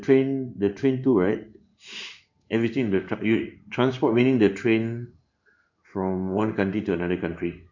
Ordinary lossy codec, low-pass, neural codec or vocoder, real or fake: none; 7.2 kHz; codec, 16 kHz in and 24 kHz out, 1 kbps, XY-Tokenizer; fake